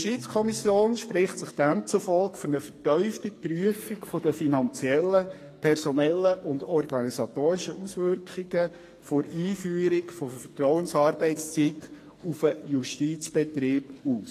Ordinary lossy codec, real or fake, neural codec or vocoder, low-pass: AAC, 48 kbps; fake; codec, 44.1 kHz, 2.6 kbps, SNAC; 14.4 kHz